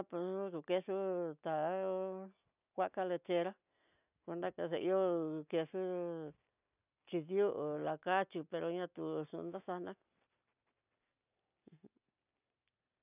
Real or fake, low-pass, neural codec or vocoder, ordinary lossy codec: real; 3.6 kHz; none; none